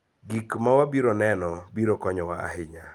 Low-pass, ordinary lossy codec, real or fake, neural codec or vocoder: 19.8 kHz; Opus, 32 kbps; real; none